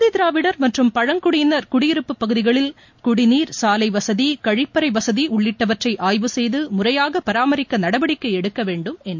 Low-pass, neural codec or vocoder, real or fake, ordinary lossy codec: 7.2 kHz; none; real; MP3, 48 kbps